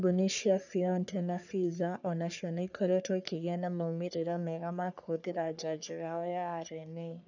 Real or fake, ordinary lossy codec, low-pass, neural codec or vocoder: fake; none; 7.2 kHz; codec, 44.1 kHz, 3.4 kbps, Pupu-Codec